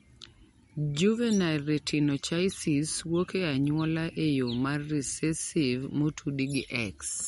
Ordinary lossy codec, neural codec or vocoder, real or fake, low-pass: MP3, 48 kbps; none; real; 19.8 kHz